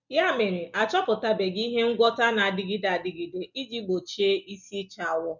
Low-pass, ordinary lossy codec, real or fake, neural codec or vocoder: 7.2 kHz; none; real; none